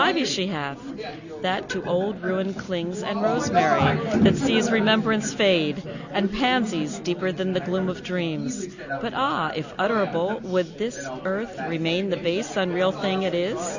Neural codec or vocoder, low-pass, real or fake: none; 7.2 kHz; real